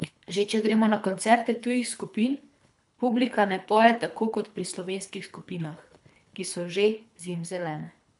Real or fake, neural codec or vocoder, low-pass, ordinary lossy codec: fake; codec, 24 kHz, 3 kbps, HILCodec; 10.8 kHz; none